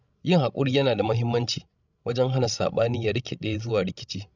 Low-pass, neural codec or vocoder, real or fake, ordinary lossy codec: 7.2 kHz; codec, 16 kHz, 16 kbps, FreqCodec, larger model; fake; none